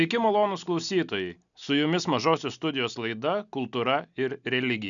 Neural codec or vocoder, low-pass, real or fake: none; 7.2 kHz; real